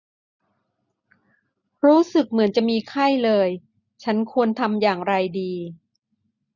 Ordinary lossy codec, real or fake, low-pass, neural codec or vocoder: none; real; 7.2 kHz; none